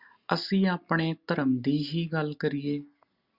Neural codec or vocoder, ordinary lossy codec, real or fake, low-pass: none; Opus, 64 kbps; real; 5.4 kHz